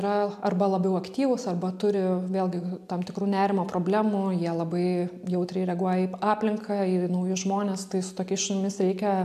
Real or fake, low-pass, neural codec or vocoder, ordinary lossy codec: real; 14.4 kHz; none; AAC, 96 kbps